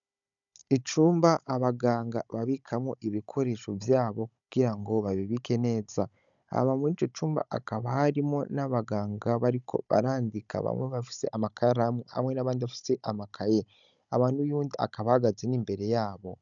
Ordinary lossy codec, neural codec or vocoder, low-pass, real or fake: MP3, 96 kbps; codec, 16 kHz, 16 kbps, FunCodec, trained on Chinese and English, 50 frames a second; 7.2 kHz; fake